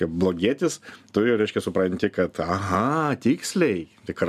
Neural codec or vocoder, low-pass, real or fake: none; 14.4 kHz; real